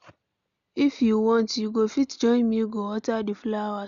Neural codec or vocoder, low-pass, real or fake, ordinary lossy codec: none; 7.2 kHz; real; none